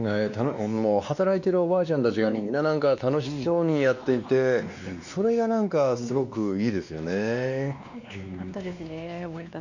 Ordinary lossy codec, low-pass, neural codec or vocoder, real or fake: none; 7.2 kHz; codec, 16 kHz, 2 kbps, X-Codec, WavLM features, trained on Multilingual LibriSpeech; fake